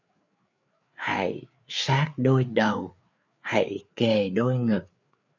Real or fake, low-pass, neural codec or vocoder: fake; 7.2 kHz; codec, 16 kHz, 4 kbps, FreqCodec, larger model